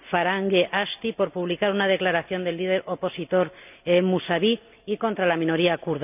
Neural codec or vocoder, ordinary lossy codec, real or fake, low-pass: none; none; real; 3.6 kHz